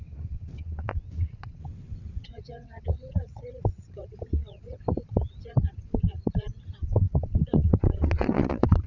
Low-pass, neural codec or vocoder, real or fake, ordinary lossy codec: 7.2 kHz; vocoder, 22.05 kHz, 80 mel bands, WaveNeXt; fake; none